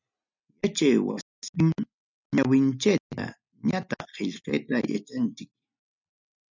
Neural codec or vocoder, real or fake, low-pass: none; real; 7.2 kHz